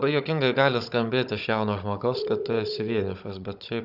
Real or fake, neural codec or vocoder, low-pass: fake; codec, 16 kHz, 16 kbps, FunCodec, trained on Chinese and English, 50 frames a second; 5.4 kHz